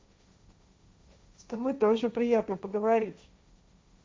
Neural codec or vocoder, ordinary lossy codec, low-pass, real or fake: codec, 16 kHz, 1.1 kbps, Voila-Tokenizer; none; none; fake